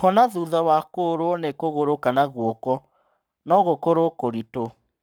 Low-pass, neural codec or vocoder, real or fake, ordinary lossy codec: none; codec, 44.1 kHz, 7.8 kbps, Pupu-Codec; fake; none